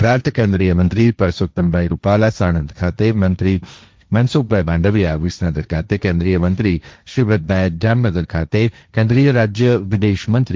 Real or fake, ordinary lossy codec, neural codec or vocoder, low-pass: fake; none; codec, 16 kHz, 1.1 kbps, Voila-Tokenizer; none